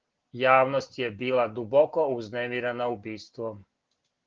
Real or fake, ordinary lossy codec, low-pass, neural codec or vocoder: real; Opus, 16 kbps; 7.2 kHz; none